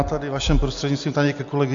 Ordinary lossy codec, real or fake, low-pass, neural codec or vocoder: AAC, 64 kbps; real; 7.2 kHz; none